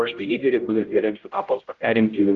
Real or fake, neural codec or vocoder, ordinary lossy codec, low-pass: fake; codec, 16 kHz, 0.5 kbps, X-Codec, HuBERT features, trained on general audio; Opus, 24 kbps; 7.2 kHz